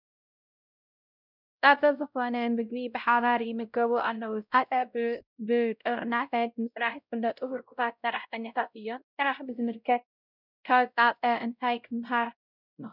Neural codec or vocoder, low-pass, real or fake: codec, 16 kHz, 0.5 kbps, X-Codec, WavLM features, trained on Multilingual LibriSpeech; 5.4 kHz; fake